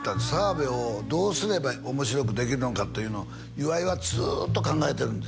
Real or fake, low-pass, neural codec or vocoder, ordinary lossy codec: real; none; none; none